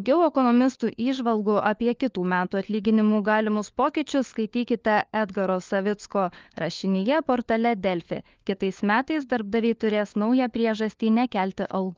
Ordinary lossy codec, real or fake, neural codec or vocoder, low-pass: Opus, 32 kbps; fake; codec, 16 kHz, 4 kbps, FunCodec, trained on LibriTTS, 50 frames a second; 7.2 kHz